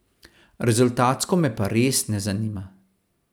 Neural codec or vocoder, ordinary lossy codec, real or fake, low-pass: none; none; real; none